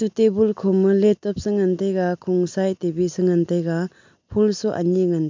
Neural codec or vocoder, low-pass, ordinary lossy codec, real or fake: none; 7.2 kHz; MP3, 64 kbps; real